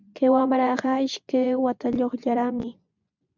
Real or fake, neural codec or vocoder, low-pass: fake; vocoder, 24 kHz, 100 mel bands, Vocos; 7.2 kHz